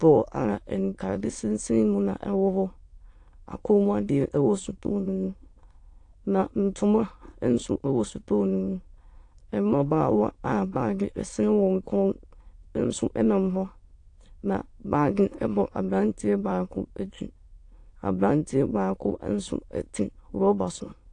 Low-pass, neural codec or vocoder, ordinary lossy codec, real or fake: 9.9 kHz; autoencoder, 22.05 kHz, a latent of 192 numbers a frame, VITS, trained on many speakers; AAC, 48 kbps; fake